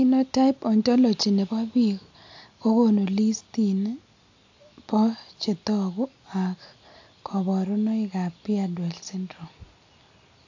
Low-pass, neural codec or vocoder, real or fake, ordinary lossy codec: 7.2 kHz; none; real; AAC, 48 kbps